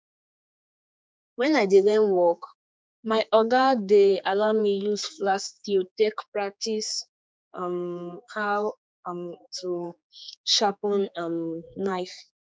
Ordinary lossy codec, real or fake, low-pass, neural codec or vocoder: none; fake; none; codec, 16 kHz, 4 kbps, X-Codec, HuBERT features, trained on general audio